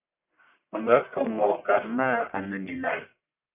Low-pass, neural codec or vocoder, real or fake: 3.6 kHz; codec, 44.1 kHz, 1.7 kbps, Pupu-Codec; fake